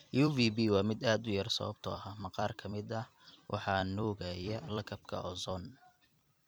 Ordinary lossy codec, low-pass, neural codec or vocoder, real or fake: none; none; vocoder, 44.1 kHz, 128 mel bands every 256 samples, BigVGAN v2; fake